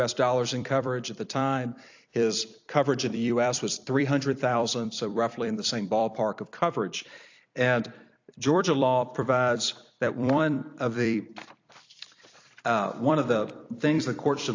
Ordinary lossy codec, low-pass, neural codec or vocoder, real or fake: AAC, 48 kbps; 7.2 kHz; vocoder, 44.1 kHz, 128 mel bands every 256 samples, BigVGAN v2; fake